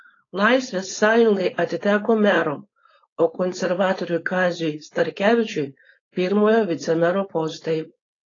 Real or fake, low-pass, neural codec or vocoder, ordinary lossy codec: fake; 7.2 kHz; codec, 16 kHz, 4.8 kbps, FACodec; AAC, 32 kbps